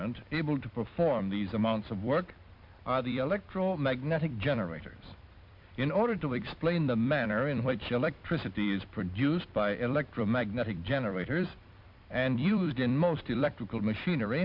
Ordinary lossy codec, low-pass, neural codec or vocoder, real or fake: MP3, 48 kbps; 5.4 kHz; vocoder, 44.1 kHz, 128 mel bands every 256 samples, BigVGAN v2; fake